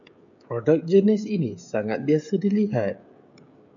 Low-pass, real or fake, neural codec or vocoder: 7.2 kHz; fake; codec, 16 kHz, 16 kbps, FreqCodec, smaller model